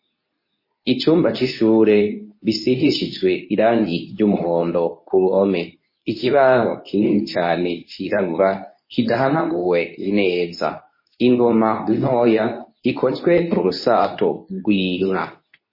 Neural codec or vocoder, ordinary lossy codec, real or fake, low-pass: codec, 24 kHz, 0.9 kbps, WavTokenizer, medium speech release version 2; MP3, 24 kbps; fake; 5.4 kHz